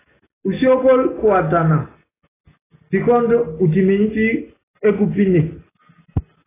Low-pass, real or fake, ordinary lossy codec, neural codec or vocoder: 3.6 kHz; real; AAC, 16 kbps; none